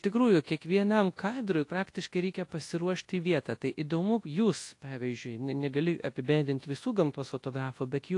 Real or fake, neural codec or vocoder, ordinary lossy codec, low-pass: fake; codec, 24 kHz, 0.9 kbps, WavTokenizer, large speech release; AAC, 48 kbps; 10.8 kHz